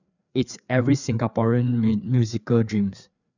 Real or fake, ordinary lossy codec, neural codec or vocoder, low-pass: fake; none; codec, 16 kHz, 4 kbps, FreqCodec, larger model; 7.2 kHz